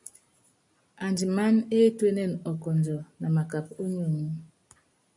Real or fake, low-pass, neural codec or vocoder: real; 10.8 kHz; none